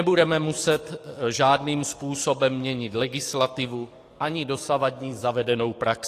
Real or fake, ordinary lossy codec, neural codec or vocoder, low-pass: fake; AAC, 48 kbps; codec, 44.1 kHz, 7.8 kbps, DAC; 14.4 kHz